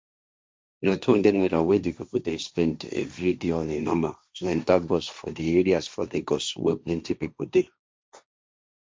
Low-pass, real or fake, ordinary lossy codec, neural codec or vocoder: none; fake; none; codec, 16 kHz, 1.1 kbps, Voila-Tokenizer